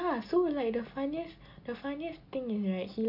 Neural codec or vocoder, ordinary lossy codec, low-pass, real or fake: none; none; 5.4 kHz; real